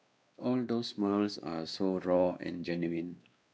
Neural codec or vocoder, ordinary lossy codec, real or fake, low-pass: codec, 16 kHz, 2 kbps, X-Codec, WavLM features, trained on Multilingual LibriSpeech; none; fake; none